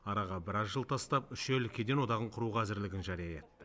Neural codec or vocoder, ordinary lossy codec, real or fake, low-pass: none; none; real; none